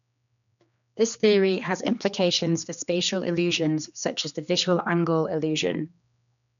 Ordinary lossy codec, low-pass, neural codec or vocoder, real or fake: none; 7.2 kHz; codec, 16 kHz, 2 kbps, X-Codec, HuBERT features, trained on general audio; fake